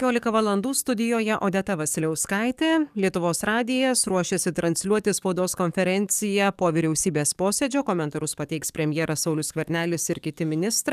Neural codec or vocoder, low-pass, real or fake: codec, 44.1 kHz, 7.8 kbps, DAC; 14.4 kHz; fake